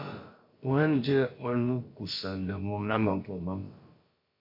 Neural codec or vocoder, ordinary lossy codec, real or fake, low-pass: codec, 16 kHz, about 1 kbps, DyCAST, with the encoder's durations; MP3, 32 kbps; fake; 5.4 kHz